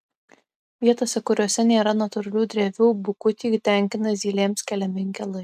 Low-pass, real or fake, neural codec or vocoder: 14.4 kHz; real; none